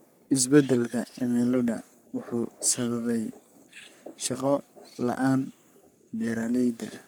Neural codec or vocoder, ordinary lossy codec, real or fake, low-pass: codec, 44.1 kHz, 3.4 kbps, Pupu-Codec; none; fake; none